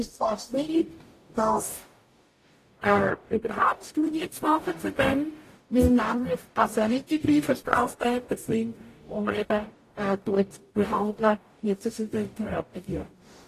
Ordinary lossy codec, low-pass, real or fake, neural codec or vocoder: AAC, 48 kbps; 14.4 kHz; fake; codec, 44.1 kHz, 0.9 kbps, DAC